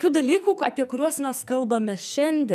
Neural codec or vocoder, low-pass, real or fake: codec, 44.1 kHz, 2.6 kbps, SNAC; 14.4 kHz; fake